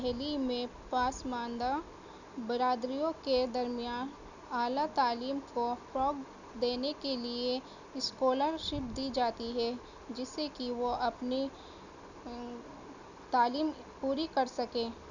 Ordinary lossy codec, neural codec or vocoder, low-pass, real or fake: none; none; 7.2 kHz; real